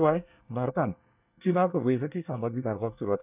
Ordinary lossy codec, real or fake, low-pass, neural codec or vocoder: none; fake; 3.6 kHz; codec, 24 kHz, 1 kbps, SNAC